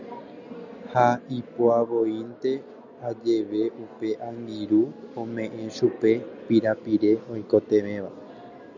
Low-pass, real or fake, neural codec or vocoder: 7.2 kHz; real; none